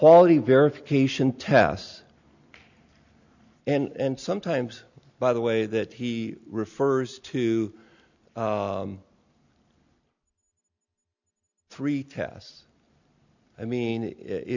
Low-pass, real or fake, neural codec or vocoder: 7.2 kHz; real; none